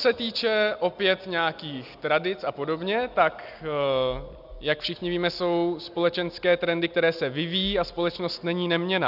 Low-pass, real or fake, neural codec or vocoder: 5.4 kHz; real; none